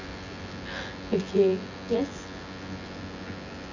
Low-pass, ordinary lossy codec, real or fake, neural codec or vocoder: 7.2 kHz; none; fake; vocoder, 24 kHz, 100 mel bands, Vocos